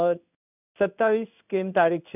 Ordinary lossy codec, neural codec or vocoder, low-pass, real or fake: none; codec, 16 kHz in and 24 kHz out, 1 kbps, XY-Tokenizer; 3.6 kHz; fake